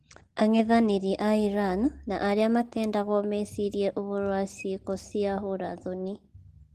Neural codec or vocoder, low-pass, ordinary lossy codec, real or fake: none; 14.4 kHz; Opus, 16 kbps; real